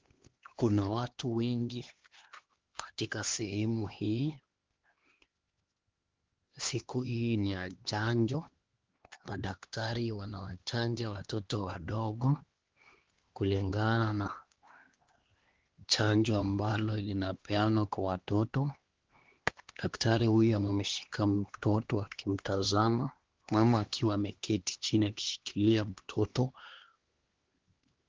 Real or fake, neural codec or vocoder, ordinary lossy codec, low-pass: fake; codec, 16 kHz, 2 kbps, X-Codec, HuBERT features, trained on LibriSpeech; Opus, 16 kbps; 7.2 kHz